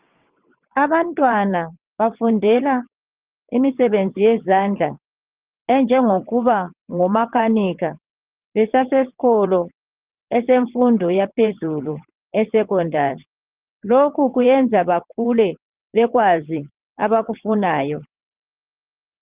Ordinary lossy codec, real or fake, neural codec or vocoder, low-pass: Opus, 32 kbps; fake; vocoder, 22.05 kHz, 80 mel bands, WaveNeXt; 3.6 kHz